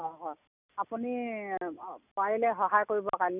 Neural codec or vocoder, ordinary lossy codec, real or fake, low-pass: none; none; real; 3.6 kHz